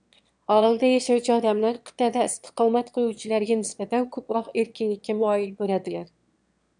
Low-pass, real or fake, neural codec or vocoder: 9.9 kHz; fake; autoencoder, 22.05 kHz, a latent of 192 numbers a frame, VITS, trained on one speaker